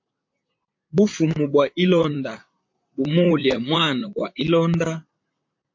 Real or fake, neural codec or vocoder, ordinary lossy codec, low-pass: fake; vocoder, 44.1 kHz, 128 mel bands, Pupu-Vocoder; MP3, 48 kbps; 7.2 kHz